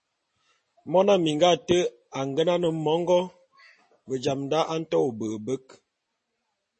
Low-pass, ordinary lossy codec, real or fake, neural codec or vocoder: 10.8 kHz; MP3, 32 kbps; real; none